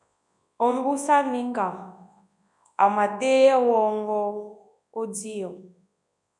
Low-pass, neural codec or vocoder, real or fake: 10.8 kHz; codec, 24 kHz, 0.9 kbps, WavTokenizer, large speech release; fake